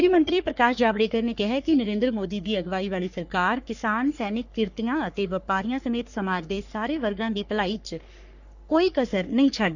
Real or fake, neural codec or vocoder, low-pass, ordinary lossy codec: fake; codec, 44.1 kHz, 3.4 kbps, Pupu-Codec; 7.2 kHz; none